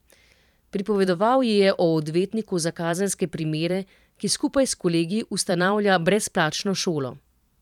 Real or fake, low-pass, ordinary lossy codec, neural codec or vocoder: fake; 19.8 kHz; none; vocoder, 44.1 kHz, 128 mel bands every 512 samples, BigVGAN v2